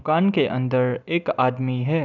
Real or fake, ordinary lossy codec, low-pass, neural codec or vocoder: real; none; 7.2 kHz; none